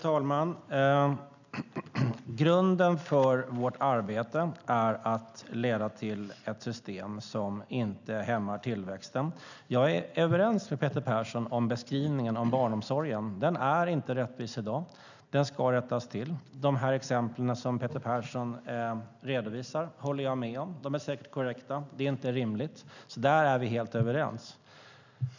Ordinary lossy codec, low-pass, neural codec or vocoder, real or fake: none; 7.2 kHz; none; real